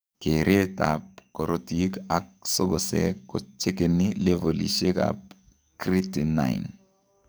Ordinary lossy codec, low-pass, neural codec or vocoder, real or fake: none; none; codec, 44.1 kHz, 7.8 kbps, DAC; fake